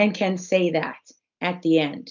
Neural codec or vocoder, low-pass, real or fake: none; 7.2 kHz; real